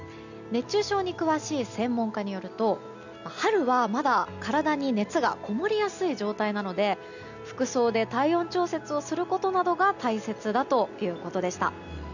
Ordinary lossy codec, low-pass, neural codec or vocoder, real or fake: none; 7.2 kHz; none; real